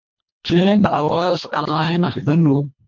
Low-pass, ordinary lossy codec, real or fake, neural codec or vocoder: 7.2 kHz; MP3, 48 kbps; fake; codec, 24 kHz, 1.5 kbps, HILCodec